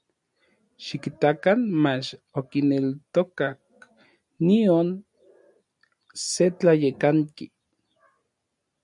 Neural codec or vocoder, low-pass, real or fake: none; 10.8 kHz; real